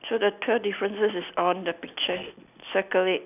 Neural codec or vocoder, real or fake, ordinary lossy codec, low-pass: none; real; none; 3.6 kHz